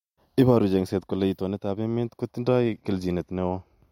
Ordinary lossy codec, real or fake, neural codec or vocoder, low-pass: MP3, 64 kbps; real; none; 19.8 kHz